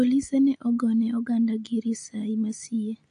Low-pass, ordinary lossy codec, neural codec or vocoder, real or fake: 9.9 kHz; AAC, 48 kbps; none; real